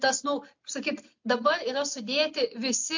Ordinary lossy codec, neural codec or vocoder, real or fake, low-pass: MP3, 48 kbps; none; real; 7.2 kHz